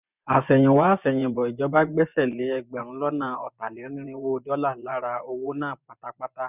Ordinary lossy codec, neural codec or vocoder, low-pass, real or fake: none; vocoder, 24 kHz, 100 mel bands, Vocos; 3.6 kHz; fake